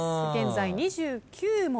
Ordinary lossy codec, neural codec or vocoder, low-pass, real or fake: none; none; none; real